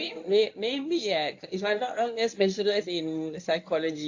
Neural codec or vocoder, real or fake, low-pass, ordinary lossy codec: codec, 24 kHz, 0.9 kbps, WavTokenizer, medium speech release version 1; fake; 7.2 kHz; AAC, 48 kbps